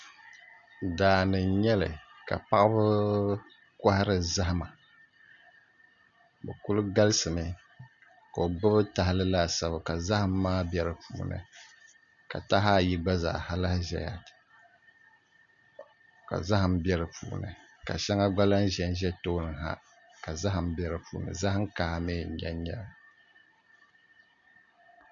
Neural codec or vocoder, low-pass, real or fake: none; 7.2 kHz; real